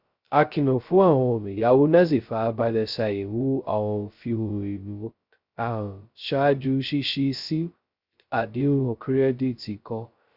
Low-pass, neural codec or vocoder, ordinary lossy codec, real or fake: 5.4 kHz; codec, 16 kHz, 0.2 kbps, FocalCodec; Opus, 64 kbps; fake